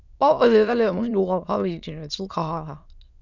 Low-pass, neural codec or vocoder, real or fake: 7.2 kHz; autoencoder, 22.05 kHz, a latent of 192 numbers a frame, VITS, trained on many speakers; fake